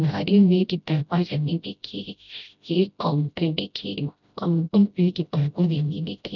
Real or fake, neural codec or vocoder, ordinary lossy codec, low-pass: fake; codec, 16 kHz, 0.5 kbps, FreqCodec, smaller model; none; 7.2 kHz